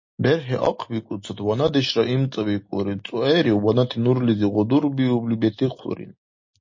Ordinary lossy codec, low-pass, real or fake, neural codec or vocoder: MP3, 32 kbps; 7.2 kHz; real; none